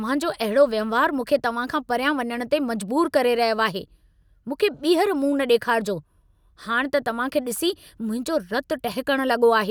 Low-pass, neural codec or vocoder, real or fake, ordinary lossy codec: none; none; real; none